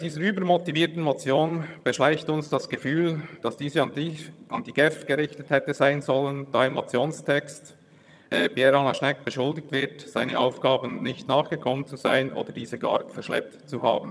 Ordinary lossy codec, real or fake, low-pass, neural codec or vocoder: none; fake; none; vocoder, 22.05 kHz, 80 mel bands, HiFi-GAN